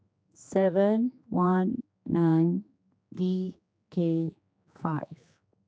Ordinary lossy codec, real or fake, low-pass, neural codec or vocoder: none; fake; none; codec, 16 kHz, 2 kbps, X-Codec, HuBERT features, trained on general audio